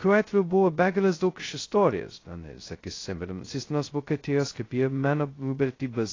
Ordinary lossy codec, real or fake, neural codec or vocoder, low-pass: AAC, 32 kbps; fake; codec, 16 kHz, 0.2 kbps, FocalCodec; 7.2 kHz